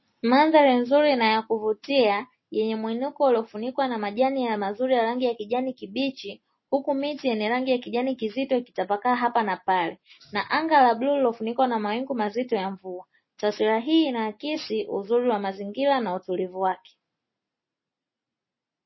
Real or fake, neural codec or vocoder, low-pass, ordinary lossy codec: real; none; 7.2 kHz; MP3, 24 kbps